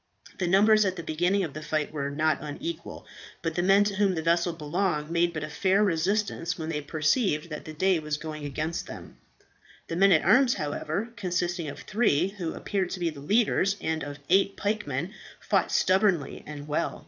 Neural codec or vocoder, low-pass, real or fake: vocoder, 22.05 kHz, 80 mel bands, Vocos; 7.2 kHz; fake